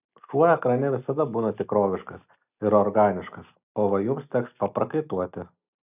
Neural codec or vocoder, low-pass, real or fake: none; 3.6 kHz; real